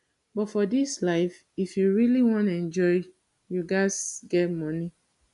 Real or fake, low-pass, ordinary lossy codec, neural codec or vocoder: real; 10.8 kHz; AAC, 96 kbps; none